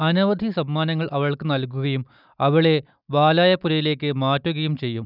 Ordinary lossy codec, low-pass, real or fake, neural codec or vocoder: none; 5.4 kHz; real; none